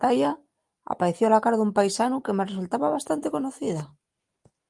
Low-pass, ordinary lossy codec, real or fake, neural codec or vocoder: 10.8 kHz; Opus, 32 kbps; real; none